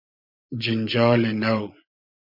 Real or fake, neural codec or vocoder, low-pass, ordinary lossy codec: fake; vocoder, 24 kHz, 100 mel bands, Vocos; 5.4 kHz; MP3, 48 kbps